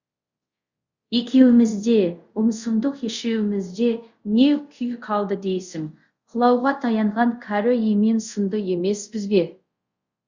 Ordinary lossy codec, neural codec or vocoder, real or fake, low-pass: Opus, 64 kbps; codec, 24 kHz, 0.5 kbps, DualCodec; fake; 7.2 kHz